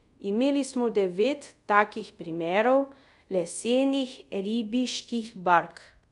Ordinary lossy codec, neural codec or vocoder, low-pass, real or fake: none; codec, 24 kHz, 0.5 kbps, DualCodec; 10.8 kHz; fake